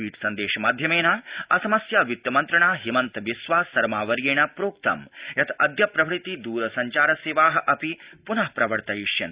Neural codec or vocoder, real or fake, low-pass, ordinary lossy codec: none; real; 3.6 kHz; Opus, 64 kbps